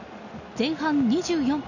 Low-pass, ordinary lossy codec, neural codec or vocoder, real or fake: 7.2 kHz; none; none; real